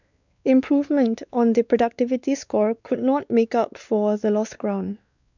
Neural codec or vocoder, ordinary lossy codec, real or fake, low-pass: codec, 16 kHz, 2 kbps, X-Codec, WavLM features, trained on Multilingual LibriSpeech; none; fake; 7.2 kHz